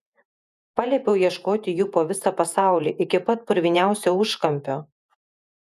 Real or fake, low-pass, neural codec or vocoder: fake; 14.4 kHz; vocoder, 48 kHz, 128 mel bands, Vocos